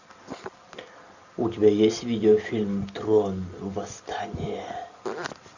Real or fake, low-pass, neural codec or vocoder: real; 7.2 kHz; none